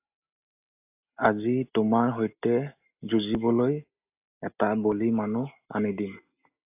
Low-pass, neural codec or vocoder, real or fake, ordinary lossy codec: 3.6 kHz; none; real; AAC, 32 kbps